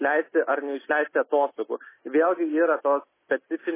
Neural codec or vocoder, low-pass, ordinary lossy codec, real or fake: none; 3.6 kHz; MP3, 16 kbps; real